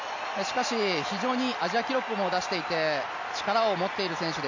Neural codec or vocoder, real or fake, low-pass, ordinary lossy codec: none; real; 7.2 kHz; none